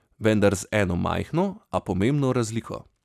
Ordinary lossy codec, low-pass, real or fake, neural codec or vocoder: none; 14.4 kHz; real; none